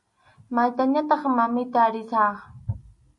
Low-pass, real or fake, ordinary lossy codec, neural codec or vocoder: 10.8 kHz; real; AAC, 64 kbps; none